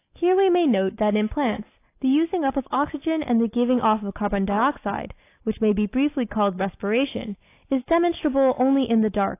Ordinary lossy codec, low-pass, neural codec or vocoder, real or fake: AAC, 24 kbps; 3.6 kHz; none; real